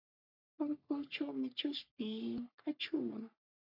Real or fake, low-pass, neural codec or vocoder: fake; 5.4 kHz; codec, 44.1 kHz, 3.4 kbps, Pupu-Codec